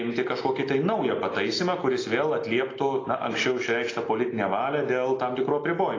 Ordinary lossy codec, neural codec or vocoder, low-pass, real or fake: AAC, 32 kbps; none; 7.2 kHz; real